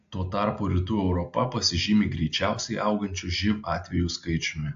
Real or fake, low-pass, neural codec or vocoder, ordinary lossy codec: real; 7.2 kHz; none; AAC, 64 kbps